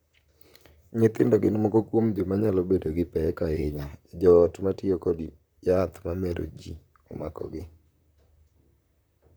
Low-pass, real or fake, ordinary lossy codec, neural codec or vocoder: none; fake; none; vocoder, 44.1 kHz, 128 mel bands, Pupu-Vocoder